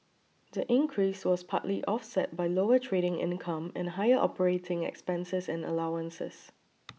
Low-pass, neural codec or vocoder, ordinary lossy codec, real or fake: none; none; none; real